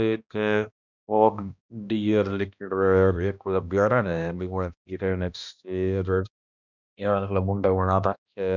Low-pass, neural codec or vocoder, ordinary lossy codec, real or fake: 7.2 kHz; codec, 16 kHz, 1 kbps, X-Codec, HuBERT features, trained on balanced general audio; none; fake